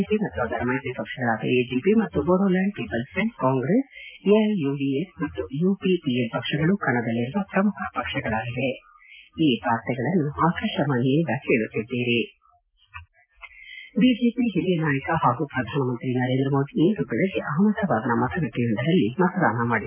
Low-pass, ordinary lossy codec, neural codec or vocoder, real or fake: 3.6 kHz; AAC, 32 kbps; none; real